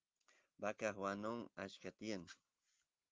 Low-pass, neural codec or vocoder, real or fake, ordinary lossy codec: 7.2 kHz; none; real; Opus, 24 kbps